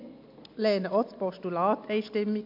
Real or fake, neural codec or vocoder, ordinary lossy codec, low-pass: real; none; MP3, 48 kbps; 5.4 kHz